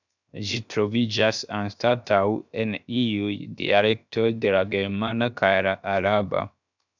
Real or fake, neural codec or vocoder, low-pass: fake; codec, 16 kHz, 0.7 kbps, FocalCodec; 7.2 kHz